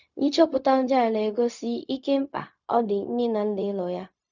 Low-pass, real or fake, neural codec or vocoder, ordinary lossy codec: 7.2 kHz; fake; codec, 16 kHz, 0.4 kbps, LongCat-Audio-Codec; none